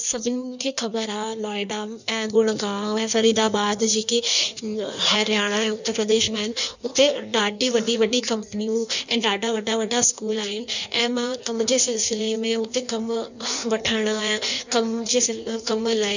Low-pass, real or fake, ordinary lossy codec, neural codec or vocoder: 7.2 kHz; fake; none; codec, 16 kHz in and 24 kHz out, 1.1 kbps, FireRedTTS-2 codec